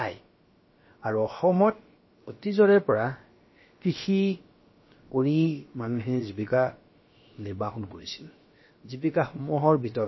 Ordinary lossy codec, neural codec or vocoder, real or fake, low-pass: MP3, 24 kbps; codec, 16 kHz, about 1 kbps, DyCAST, with the encoder's durations; fake; 7.2 kHz